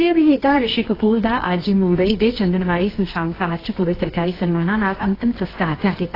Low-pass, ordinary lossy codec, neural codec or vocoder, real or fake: 5.4 kHz; AAC, 24 kbps; codec, 24 kHz, 0.9 kbps, WavTokenizer, medium music audio release; fake